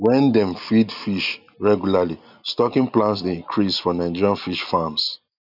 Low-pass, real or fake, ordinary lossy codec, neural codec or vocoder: 5.4 kHz; real; none; none